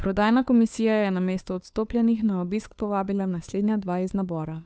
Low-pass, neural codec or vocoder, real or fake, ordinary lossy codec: none; codec, 16 kHz, 2 kbps, FunCodec, trained on Chinese and English, 25 frames a second; fake; none